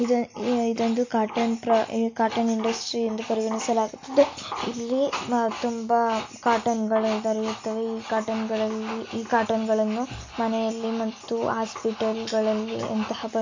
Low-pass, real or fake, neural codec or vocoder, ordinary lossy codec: 7.2 kHz; real; none; MP3, 48 kbps